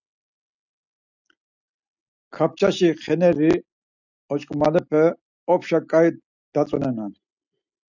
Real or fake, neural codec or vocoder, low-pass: real; none; 7.2 kHz